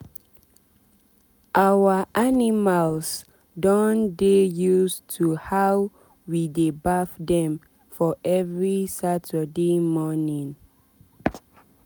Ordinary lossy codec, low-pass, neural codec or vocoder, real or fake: none; none; none; real